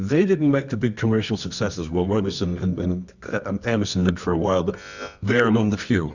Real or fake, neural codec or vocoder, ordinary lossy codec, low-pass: fake; codec, 24 kHz, 0.9 kbps, WavTokenizer, medium music audio release; Opus, 64 kbps; 7.2 kHz